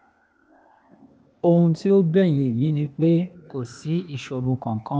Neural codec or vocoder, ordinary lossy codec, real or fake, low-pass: codec, 16 kHz, 0.8 kbps, ZipCodec; none; fake; none